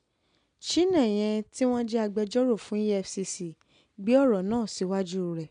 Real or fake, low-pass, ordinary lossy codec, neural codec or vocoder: real; 9.9 kHz; none; none